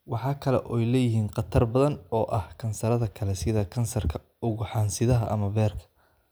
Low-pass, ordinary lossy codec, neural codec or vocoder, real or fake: none; none; none; real